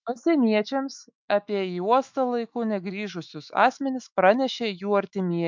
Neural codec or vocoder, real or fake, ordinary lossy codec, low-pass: autoencoder, 48 kHz, 128 numbers a frame, DAC-VAE, trained on Japanese speech; fake; MP3, 64 kbps; 7.2 kHz